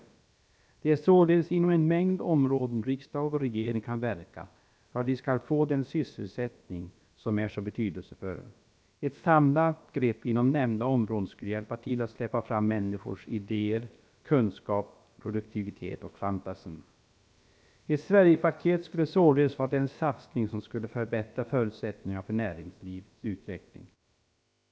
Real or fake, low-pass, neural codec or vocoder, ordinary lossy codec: fake; none; codec, 16 kHz, about 1 kbps, DyCAST, with the encoder's durations; none